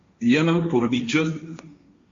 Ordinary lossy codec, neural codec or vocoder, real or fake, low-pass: AAC, 48 kbps; codec, 16 kHz, 1.1 kbps, Voila-Tokenizer; fake; 7.2 kHz